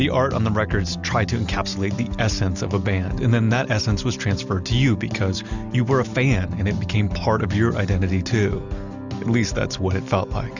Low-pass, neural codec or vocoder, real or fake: 7.2 kHz; none; real